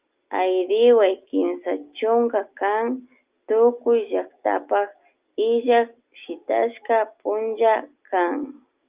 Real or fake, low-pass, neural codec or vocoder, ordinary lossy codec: real; 3.6 kHz; none; Opus, 24 kbps